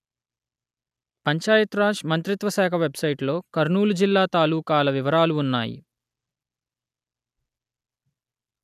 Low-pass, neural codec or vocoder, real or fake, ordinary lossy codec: 14.4 kHz; none; real; none